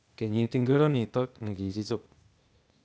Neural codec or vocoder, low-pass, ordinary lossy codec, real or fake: codec, 16 kHz, 0.8 kbps, ZipCodec; none; none; fake